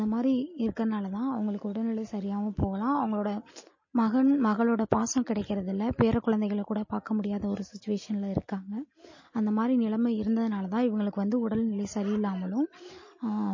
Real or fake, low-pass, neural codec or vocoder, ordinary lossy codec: real; 7.2 kHz; none; MP3, 32 kbps